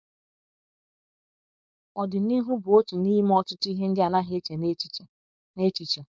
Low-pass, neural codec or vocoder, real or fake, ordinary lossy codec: none; codec, 16 kHz, 4.8 kbps, FACodec; fake; none